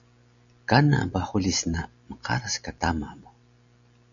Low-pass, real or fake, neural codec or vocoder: 7.2 kHz; real; none